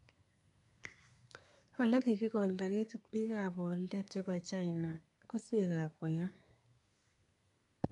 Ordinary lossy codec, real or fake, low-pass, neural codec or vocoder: none; fake; 10.8 kHz; codec, 24 kHz, 1 kbps, SNAC